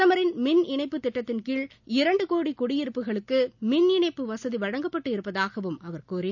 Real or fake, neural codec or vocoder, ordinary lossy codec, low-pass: real; none; none; 7.2 kHz